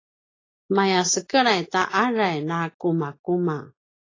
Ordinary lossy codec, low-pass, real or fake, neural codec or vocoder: AAC, 32 kbps; 7.2 kHz; real; none